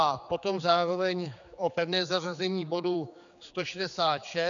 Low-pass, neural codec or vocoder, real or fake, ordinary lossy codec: 7.2 kHz; codec, 16 kHz, 4 kbps, X-Codec, HuBERT features, trained on general audio; fake; MP3, 96 kbps